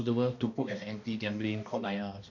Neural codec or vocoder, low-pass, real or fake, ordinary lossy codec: codec, 16 kHz, 1 kbps, X-Codec, HuBERT features, trained on balanced general audio; 7.2 kHz; fake; none